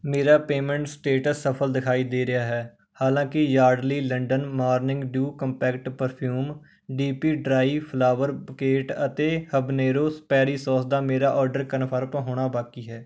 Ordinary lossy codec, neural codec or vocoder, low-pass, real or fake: none; none; none; real